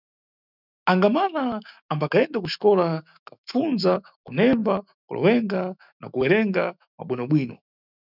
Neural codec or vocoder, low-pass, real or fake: autoencoder, 48 kHz, 128 numbers a frame, DAC-VAE, trained on Japanese speech; 5.4 kHz; fake